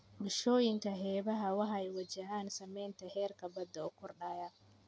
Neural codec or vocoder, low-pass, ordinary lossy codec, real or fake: none; none; none; real